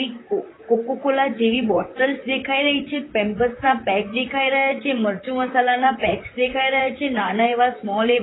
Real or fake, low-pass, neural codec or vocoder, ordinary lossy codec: fake; 7.2 kHz; vocoder, 44.1 kHz, 128 mel bands, Pupu-Vocoder; AAC, 16 kbps